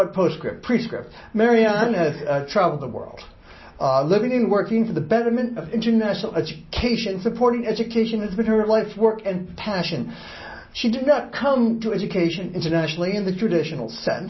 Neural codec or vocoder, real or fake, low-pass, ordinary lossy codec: none; real; 7.2 kHz; MP3, 24 kbps